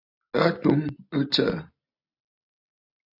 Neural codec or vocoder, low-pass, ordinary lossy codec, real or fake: none; 5.4 kHz; AAC, 48 kbps; real